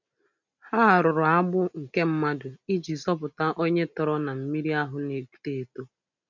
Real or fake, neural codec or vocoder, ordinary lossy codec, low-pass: real; none; none; 7.2 kHz